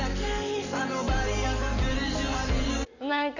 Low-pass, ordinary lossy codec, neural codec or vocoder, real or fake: 7.2 kHz; none; none; real